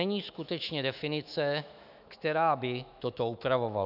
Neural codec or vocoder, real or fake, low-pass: autoencoder, 48 kHz, 128 numbers a frame, DAC-VAE, trained on Japanese speech; fake; 5.4 kHz